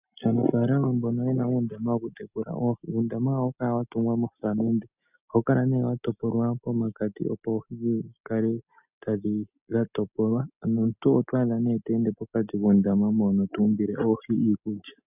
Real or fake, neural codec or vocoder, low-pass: real; none; 3.6 kHz